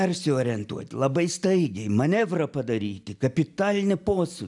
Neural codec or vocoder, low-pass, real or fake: none; 10.8 kHz; real